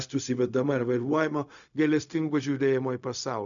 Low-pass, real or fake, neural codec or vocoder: 7.2 kHz; fake; codec, 16 kHz, 0.4 kbps, LongCat-Audio-Codec